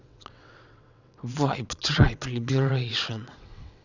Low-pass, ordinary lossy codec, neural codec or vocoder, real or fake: 7.2 kHz; none; vocoder, 22.05 kHz, 80 mel bands, WaveNeXt; fake